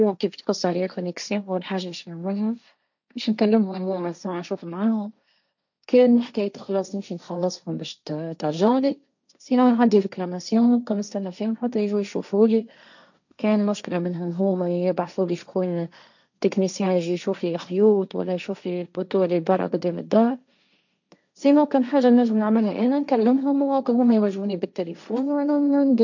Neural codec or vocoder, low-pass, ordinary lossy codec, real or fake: codec, 16 kHz, 1.1 kbps, Voila-Tokenizer; 7.2 kHz; none; fake